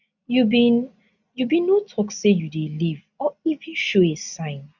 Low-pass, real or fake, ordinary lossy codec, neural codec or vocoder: 7.2 kHz; real; none; none